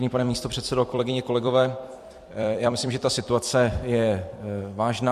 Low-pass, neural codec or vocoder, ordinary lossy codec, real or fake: 14.4 kHz; vocoder, 44.1 kHz, 128 mel bands every 256 samples, BigVGAN v2; MP3, 64 kbps; fake